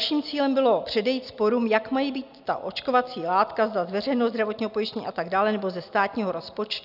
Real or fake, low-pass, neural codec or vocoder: real; 5.4 kHz; none